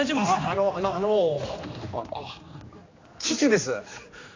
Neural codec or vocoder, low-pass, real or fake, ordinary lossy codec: codec, 16 kHz, 2 kbps, X-Codec, HuBERT features, trained on general audio; 7.2 kHz; fake; AAC, 32 kbps